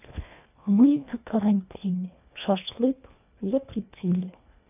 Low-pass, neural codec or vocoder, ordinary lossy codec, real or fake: 3.6 kHz; codec, 24 kHz, 1.5 kbps, HILCodec; none; fake